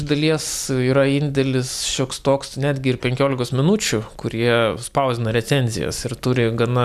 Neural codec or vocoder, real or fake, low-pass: none; real; 14.4 kHz